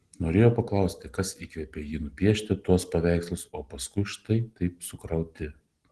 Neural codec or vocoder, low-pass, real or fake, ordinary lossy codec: none; 10.8 kHz; real; Opus, 16 kbps